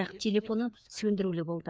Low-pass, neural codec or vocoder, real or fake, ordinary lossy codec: none; codec, 16 kHz, 2 kbps, FreqCodec, larger model; fake; none